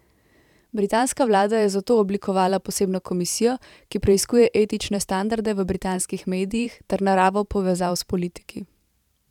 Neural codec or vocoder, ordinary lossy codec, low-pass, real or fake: vocoder, 44.1 kHz, 128 mel bands every 512 samples, BigVGAN v2; none; 19.8 kHz; fake